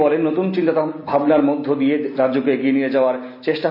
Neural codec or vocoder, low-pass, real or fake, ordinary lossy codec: none; 5.4 kHz; real; none